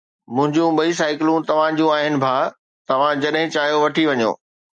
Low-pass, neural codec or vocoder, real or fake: 9.9 kHz; none; real